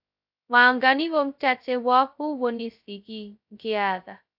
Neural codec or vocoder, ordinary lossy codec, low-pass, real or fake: codec, 16 kHz, 0.2 kbps, FocalCodec; AAC, 48 kbps; 5.4 kHz; fake